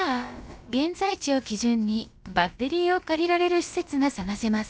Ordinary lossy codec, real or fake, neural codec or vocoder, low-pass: none; fake; codec, 16 kHz, about 1 kbps, DyCAST, with the encoder's durations; none